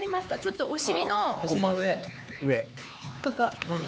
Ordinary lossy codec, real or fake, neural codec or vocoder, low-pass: none; fake; codec, 16 kHz, 4 kbps, X-Codec, HuBERT features, trained on LibriSpeech; none